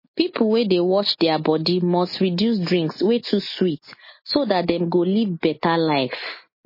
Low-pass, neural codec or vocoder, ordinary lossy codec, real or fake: 5.4 kHz; none; MP3, 24 kbps; real